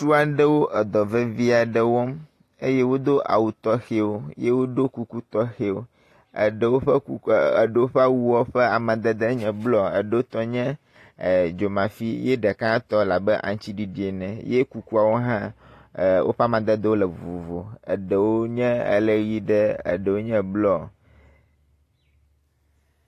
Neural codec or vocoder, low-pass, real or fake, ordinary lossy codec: none; 14.4 kHz; real; AAC, 48 kbps